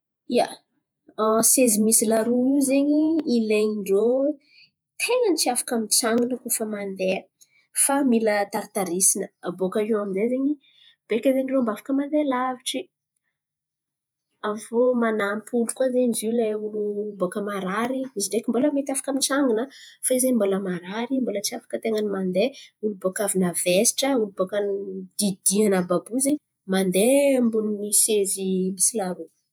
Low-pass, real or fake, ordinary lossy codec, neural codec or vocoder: none; fake; none; vocoder, 48 kHz, 128 mel bands, Vocos